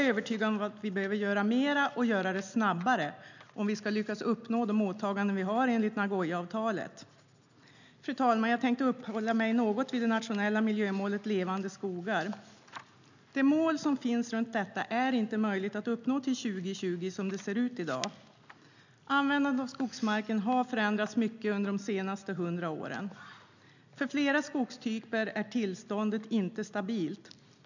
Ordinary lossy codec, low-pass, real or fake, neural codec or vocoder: none; 7.2 kHz; real; none